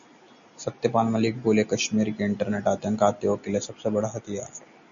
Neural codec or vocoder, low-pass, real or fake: none; 7.2 kHz; real